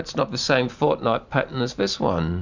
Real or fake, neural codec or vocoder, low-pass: real; none; 7.2 kHz